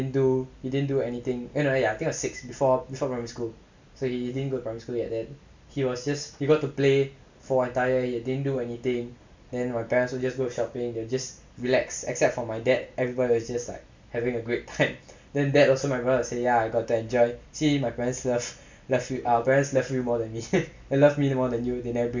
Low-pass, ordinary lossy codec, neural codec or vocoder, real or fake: 7.2 kHz; MP3, 64 kbps; none; real